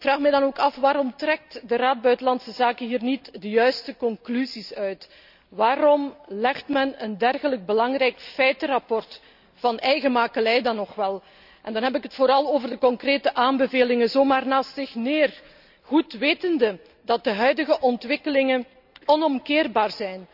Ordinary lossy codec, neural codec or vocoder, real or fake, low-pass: none; none; real; 5.4 kHz